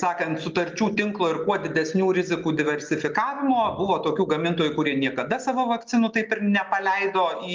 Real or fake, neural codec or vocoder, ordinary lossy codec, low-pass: real; none; Opus, 24 kbps; 7.2 kHz